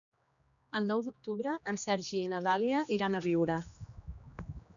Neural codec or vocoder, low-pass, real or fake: codec, 16 kHz, 2 kbps, X-Codec, HuBERT features, trained on general audio; 7.2 kHz; fake